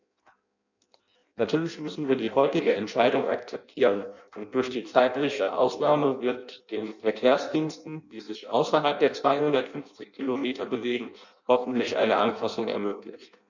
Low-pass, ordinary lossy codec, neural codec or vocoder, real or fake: 7.2 kHz; none; codec, 16 kHz in and 24 kHz out, 0.6 kbps, FireRedTTS-2 codec; fake